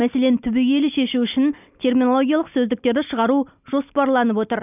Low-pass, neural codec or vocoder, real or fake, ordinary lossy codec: 3.6 kHz; none; real; none